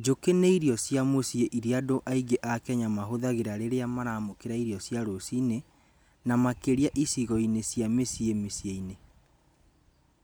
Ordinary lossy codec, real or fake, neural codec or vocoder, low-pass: none; real; none; none